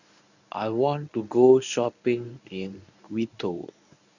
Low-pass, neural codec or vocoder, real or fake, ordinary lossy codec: 7.2 kHz; codec, 24 kHz, 0.9 kbps, WavTokenizer, medium speech release version 1; fake; none